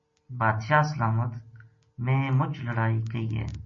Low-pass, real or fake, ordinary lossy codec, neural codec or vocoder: 7.2 kHz; real; MP3, 32 kbps; none